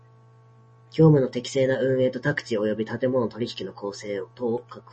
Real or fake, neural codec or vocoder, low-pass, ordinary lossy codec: real; none; 9.9 kHz; MP3, 32 kbps